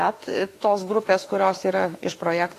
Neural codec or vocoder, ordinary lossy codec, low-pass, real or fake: autoencoder, 48 kHz, 32 numbers a frame, DAC-VAE, trained on Japanese speech; AAC, 48 kbps; 14.4 kHz; fake